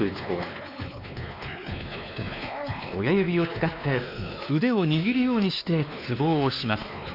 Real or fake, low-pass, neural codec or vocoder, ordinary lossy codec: fake; 5.4 kHz; codec, 16 kHz, 2 kbps, X-Codec, WavLM features, trained on Multilingual LibriSpeech; none